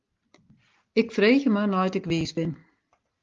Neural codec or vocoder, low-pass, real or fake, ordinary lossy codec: none; 7.2 kHz; real; Opus, 32 kbps